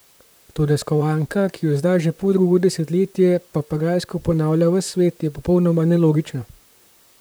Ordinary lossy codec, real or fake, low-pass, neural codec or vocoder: none; fake; none; vocoder, 44.1 kHz, 128 mel bands, Pupu-Vocoder